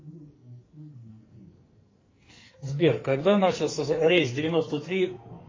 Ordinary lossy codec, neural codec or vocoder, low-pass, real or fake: MP3, 32 kbps; codec, 44.1 kHz, 2.6 kbps, SNAC; 7.2 kHz; fake